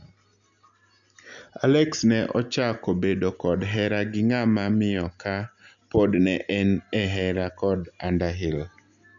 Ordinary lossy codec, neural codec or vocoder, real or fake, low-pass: none; none; real; 7.2 kHz